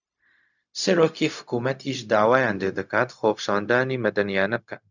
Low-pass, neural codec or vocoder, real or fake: 7.2 kHz; codec, 16 kHz, 0.4 kbps, LongCat-Audio-Codec; fake